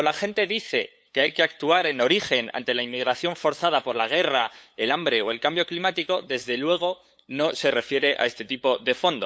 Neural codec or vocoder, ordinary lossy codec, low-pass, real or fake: codec, 16 kHz, 8 kbps, FunCodec, trained on LibriTTS, 25 frames a second; none; none; fake